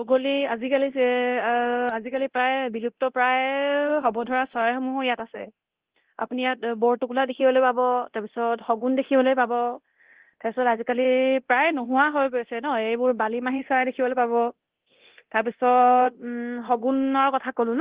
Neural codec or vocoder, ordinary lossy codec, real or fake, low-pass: codec, 24 kHz, 0.9 kbps, DualCodec; Opus, 16 kbps; fake; 3.6 kHz